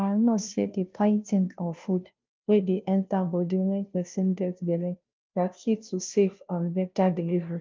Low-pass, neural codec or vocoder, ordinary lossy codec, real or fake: 7.2 kHz; codec, 16 kHz, 0.5 kbps, FunCodec, trained on Chinese and English, 25 frames a second; Opus, 24 kbps; fake